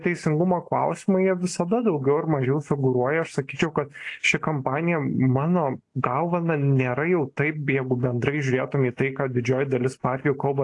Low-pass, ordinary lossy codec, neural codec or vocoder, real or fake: 10.8 kHz; AAC, 48 kbps; none; real